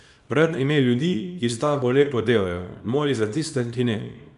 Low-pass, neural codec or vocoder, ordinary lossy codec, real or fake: 10.8 kHz; codec, 24 kHz, 0.9 kbps, WavTokenizer, small release; none; fake